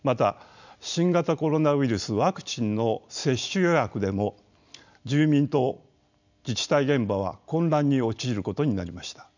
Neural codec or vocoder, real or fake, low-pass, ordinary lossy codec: none; real; 7.2 kHz; none